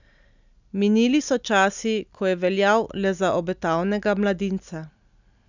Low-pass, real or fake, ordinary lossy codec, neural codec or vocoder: 7.2 kHz; real; none; none